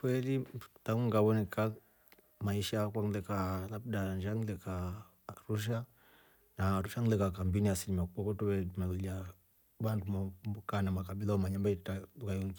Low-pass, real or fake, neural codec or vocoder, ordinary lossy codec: none; real; none; none